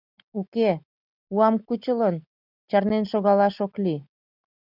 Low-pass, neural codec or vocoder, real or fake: 5.4 kHz; none; real